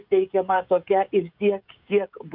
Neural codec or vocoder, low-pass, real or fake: codec, 16 kHz, 16 kbps, FreqCodec, smaller model; 5.4 kHz; fake